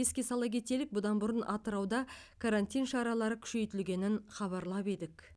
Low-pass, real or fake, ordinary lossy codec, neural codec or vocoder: none; real; none; none